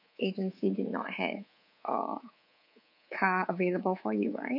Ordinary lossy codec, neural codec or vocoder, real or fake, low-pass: none; codec, 24 kHz, 3.1 kbps, DualCodec; fake; 5.4 kHz